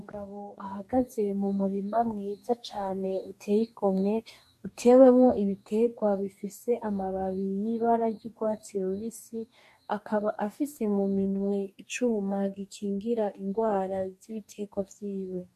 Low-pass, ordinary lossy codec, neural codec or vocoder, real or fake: 14.4 kHz; MP3, 64 kbps; codec, 44.1 kHz, 2.6 kbps, DAC; fake